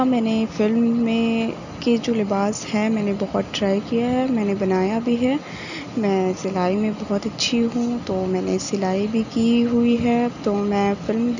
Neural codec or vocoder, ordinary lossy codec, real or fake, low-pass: none; none; real; 7.2 kHz